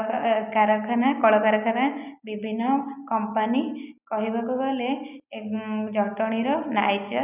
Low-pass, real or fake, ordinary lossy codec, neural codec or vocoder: 3.6 kHz; real; none; none